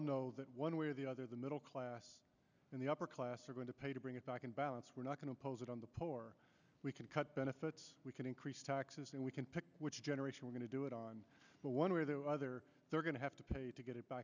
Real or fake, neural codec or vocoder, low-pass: real; none; 7.2 kHz